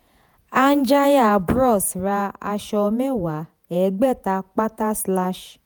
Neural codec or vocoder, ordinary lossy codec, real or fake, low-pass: vocoder, 48 kHz, 128 mel bands, Vocos; none; fake; none